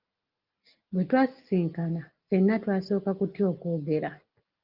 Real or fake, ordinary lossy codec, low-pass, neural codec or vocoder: real; Opus, 32 kbps; 5.4 kHz; none